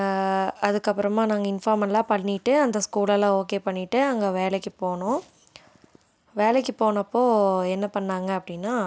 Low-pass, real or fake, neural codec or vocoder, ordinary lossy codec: none; real; none; none